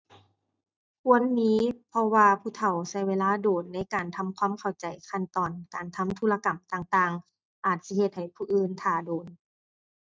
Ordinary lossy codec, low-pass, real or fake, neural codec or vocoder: none; none; real; none